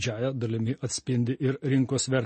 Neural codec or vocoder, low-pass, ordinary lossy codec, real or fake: none; 10.8 kHz; MP3, 32 kbps; real